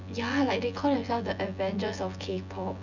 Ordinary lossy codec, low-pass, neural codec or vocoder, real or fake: none; 7.2 kHz; vocoder, 24 kHz, 100 mel bands, Vocos; fake